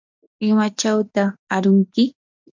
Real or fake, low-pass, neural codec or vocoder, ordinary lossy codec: real; 7.2 kHz; none; MP3, 64 kbps